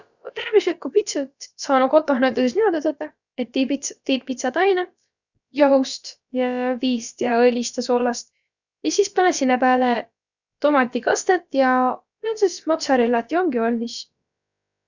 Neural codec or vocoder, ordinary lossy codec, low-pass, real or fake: codec, 16 kHz, about 1 kbps, DyCAST, with the encoder's durations; none; 7.2 kHz; fake